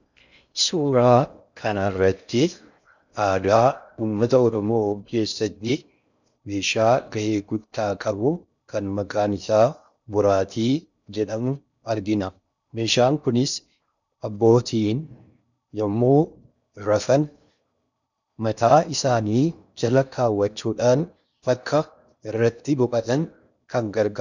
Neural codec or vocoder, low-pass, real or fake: codec, 16 kHz in and 24 kHz out, 0.6 kbps, FocalCodec, streaming, 4096 codes; 7.2 kHz; fake